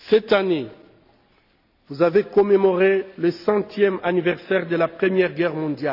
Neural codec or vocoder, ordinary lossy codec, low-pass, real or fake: none; none; 5.4 kHz; real